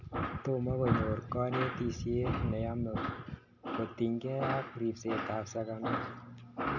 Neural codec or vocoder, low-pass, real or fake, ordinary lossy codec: none; 7.2 kHz; real; none